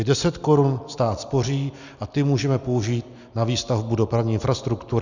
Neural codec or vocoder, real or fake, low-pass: none; real; 7.2 kHz